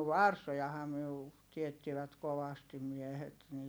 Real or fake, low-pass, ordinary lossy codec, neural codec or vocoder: real; none; none; none